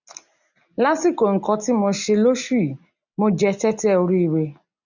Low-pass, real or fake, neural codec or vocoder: 7.2 kHz; real; none